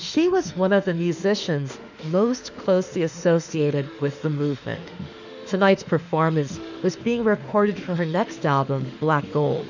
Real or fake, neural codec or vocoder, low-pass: fake; autoencoder, 48 kHz, 32 numbers a frame, DAC-VAE, trained on Japanese speech; 7.2 kHz